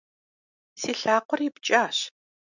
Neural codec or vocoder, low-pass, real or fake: none; 7.2 kHz; real